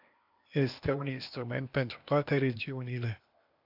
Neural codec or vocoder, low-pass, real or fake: codec, 16 kHz, 0.8 kbps, ZipCodec; 5.4 kHz; fake